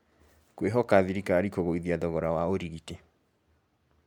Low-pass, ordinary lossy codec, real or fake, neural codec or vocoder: 19.8 kHz; MP3, 96 kbps; real; none